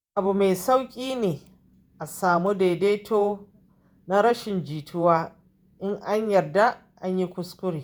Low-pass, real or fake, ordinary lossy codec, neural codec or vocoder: none; fake; none; vocoder, 48 kHz, 128 mel bands, Vocos